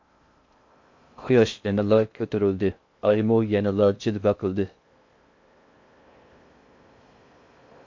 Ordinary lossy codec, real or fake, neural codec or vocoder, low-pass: MP3, 48 kbps; fake; codec, 16 kHz in and 24 kHz out, 0.6 kbps, FocalCodec, streaming, 2048 codes; 7.2 kHz